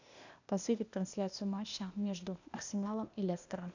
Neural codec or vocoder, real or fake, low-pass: codec, 16 kHz, 0.8 kbps, ZipCodec; fake; 7.2 kHz